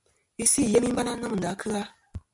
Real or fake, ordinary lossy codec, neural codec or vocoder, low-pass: real; MP3, 64 kbps; none; 10.8 kHz